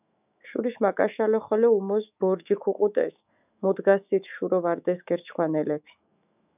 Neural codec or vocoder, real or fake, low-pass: autoencoder, 48 kHz, 128 numbers a frame, DAC-VAE, trained on Japanese speech; fake; 3.6 kHz